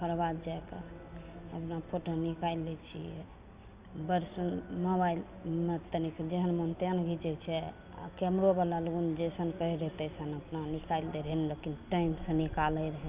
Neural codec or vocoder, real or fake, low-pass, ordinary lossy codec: none; real; 3.6 kHz; Opus, 64 kbps